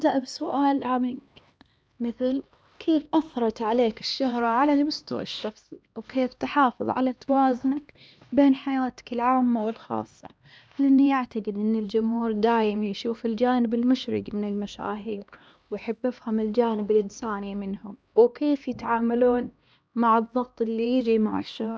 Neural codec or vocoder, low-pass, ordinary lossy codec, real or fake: codec, 16 kHz, 2 kbps, X-Codec, HuBERT features, trained on LibriSpeech; none; none; fake